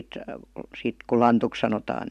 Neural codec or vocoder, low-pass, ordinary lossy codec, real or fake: none; 14.4 kHz; AAC, 96 kbps; real